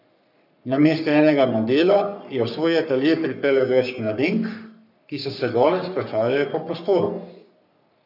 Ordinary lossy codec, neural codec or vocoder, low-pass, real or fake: none; codec, 44.1 kHz, 3.4 kbps, Pupu-Codec; 5.4 kHz; fake